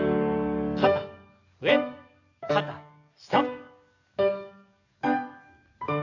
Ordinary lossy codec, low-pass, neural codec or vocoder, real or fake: none; 7.2 kHz; codec, 44.1 kHz, 7.8 kbps, DAC; fake